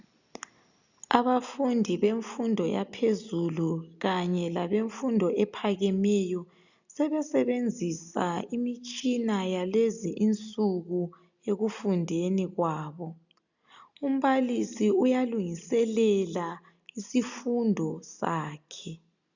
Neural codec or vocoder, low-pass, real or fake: none; 7.2 kHz; real